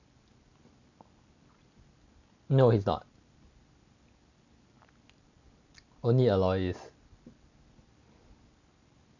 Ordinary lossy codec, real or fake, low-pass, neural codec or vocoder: none; real; 7.2 kHz; none